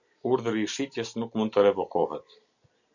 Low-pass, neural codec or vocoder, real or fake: 7.2 kHz; none; real